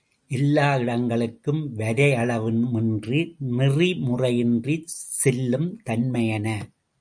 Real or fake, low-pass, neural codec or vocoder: real; 9.9 kHz; none